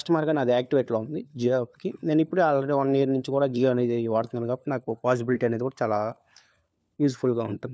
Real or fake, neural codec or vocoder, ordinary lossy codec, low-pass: fake; codec, 16 kHz, 4 kbps, FunCodec, trained on LibriTTS, 50 frames a second; none; none